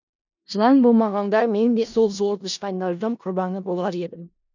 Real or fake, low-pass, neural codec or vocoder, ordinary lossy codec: fake; 7.2 kHz; codec, 16 kHz in and 24 kHz out, 0.4 kbps, LongCat-Audio-Codec, four codebook decoder; none